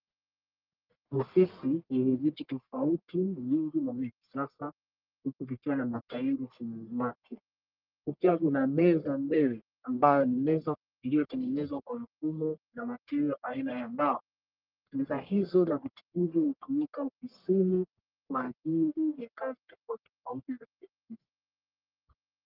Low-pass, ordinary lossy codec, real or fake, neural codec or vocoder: 5.4 kHz; Opus, 32 kbps; fake; codec, 44.1 kHz, 1.7 kbps, Pupu-Codec